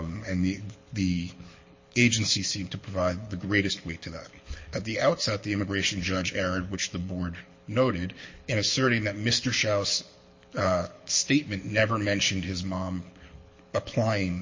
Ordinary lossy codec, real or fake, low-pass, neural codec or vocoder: MP3, 32 kbps; fake; 7.2 kHz; codec, 24 kHz, 6 kbps, HILCodec